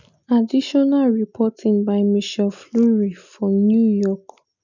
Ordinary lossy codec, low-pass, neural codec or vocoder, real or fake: none; 7.2 kHz; none; real